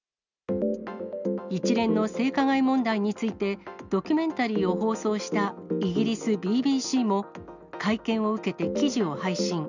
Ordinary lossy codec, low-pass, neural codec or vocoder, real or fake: none; 7.2 kHz; none; real